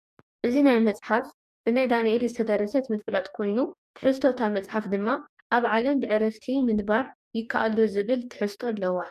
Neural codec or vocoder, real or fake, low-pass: codec, 44.1 kHz, 2.6 kbps, DAC; fake; 14.4 kHz